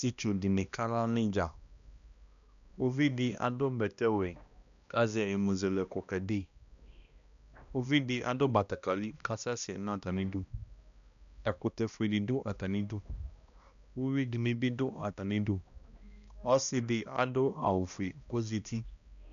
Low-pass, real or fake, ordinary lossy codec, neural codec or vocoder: 7.2 kHz; fake; MP3, 96 kbps; codec, 16 kHz, 1 kbps, X-Codec, HuBERT features, trained on balanced general audio